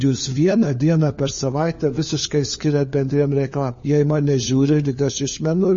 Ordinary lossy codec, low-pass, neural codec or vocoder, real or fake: MP3, 32 kbps; 7.2 kHz; codec, 16 kHz, 4 kbps, FunCodec, trained on LibriTTS, 50 frames a second; fake